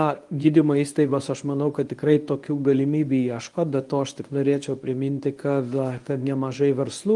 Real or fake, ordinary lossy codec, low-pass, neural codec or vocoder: fake; Opus, 32 kbps; 10.8 kHz; codec, 24 kHz, 0.9 kbps, WavTokenizer, medium speech release version 1